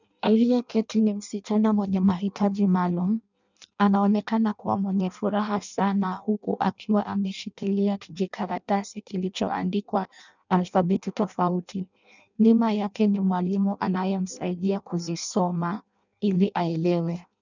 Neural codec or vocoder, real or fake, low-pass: codec, 16 kHz in and 24 kHz out, 0.6 kbps, FireRedTTS-2 codec; fake; 7.2 kHz